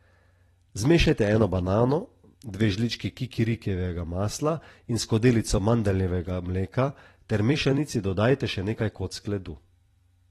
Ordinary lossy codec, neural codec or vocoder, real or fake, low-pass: AAC, 32 kbps; vocoder, 44.1 kHz, 128 mel bands every 256 samples, BigVGAN v2; fake; 19.8 kHz